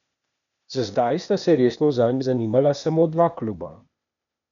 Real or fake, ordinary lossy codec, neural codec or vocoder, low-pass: fake; none; codec, 16 kHz, 0.8 kbps, ZipCodec; 7.2 kHz